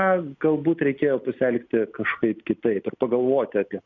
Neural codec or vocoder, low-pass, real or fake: none; 7.2 kHz; real